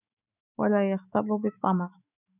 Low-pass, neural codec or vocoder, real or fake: 3.6 kHz; autoencoder, 48 kHz, 128 numbers a frame, DAC-VAE, trained on Japanese speech; fake